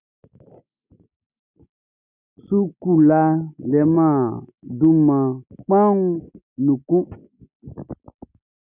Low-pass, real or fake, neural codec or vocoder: 3.6 kHz; real; none